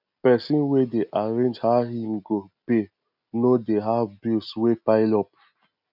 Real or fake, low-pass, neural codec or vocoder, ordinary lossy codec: real; 5.4 kHz; none; none